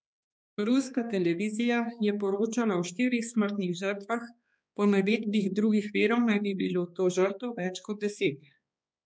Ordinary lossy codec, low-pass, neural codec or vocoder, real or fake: none; none; codec, 16 kHz, 2 kbps, X-Codec, HuBERT features, trained on balanced general audio; fake